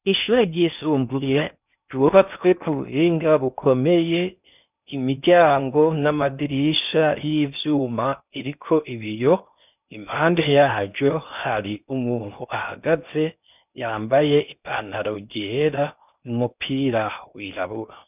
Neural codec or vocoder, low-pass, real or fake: codec, 16 kHz in and 24 kHz out, 0.6 kbps, FocalCodec, streaming, 4096 codes; 3.6 kHz; fake